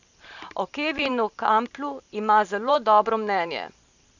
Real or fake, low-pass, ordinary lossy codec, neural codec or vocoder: fake; 7.2 kHz; none; vocoder, 44.1 kHz, 128 mel bands every 512 samples, BigVGAN v2